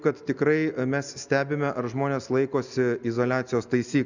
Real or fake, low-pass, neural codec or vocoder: real; 7.2 kHz; none